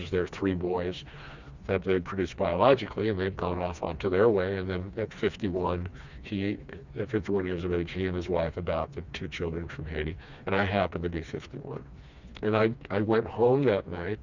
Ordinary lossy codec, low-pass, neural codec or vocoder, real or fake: Opus, 64 kbps; 7.2 kHz; codec, 16 kHz, 2 kbps, FreqCodec, smaller model; fake